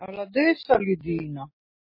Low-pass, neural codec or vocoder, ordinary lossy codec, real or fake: 5.4 kHz; none; MP3, 24 kbps; real